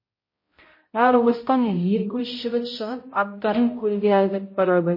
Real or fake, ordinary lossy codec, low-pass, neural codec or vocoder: fake; MP3, 24 kbps; 5.4 kHz; codec, 16 kHz, 0.5 kbps, X-Codec, HuBERT features, trained on balanced general audio